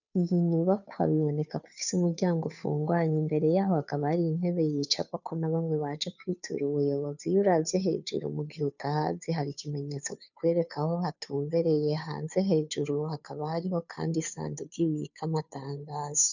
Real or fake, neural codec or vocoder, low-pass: fake; codec, 16 kHz, 2 kbps, FunCodec, trained on Chinese and English, 25 frames a second; 7.2 kHz